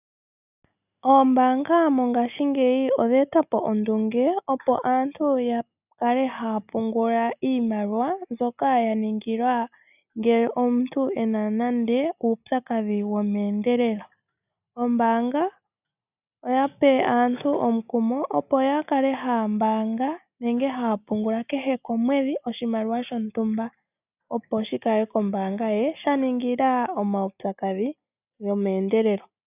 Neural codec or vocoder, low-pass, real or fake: none; 3.6 kHz; real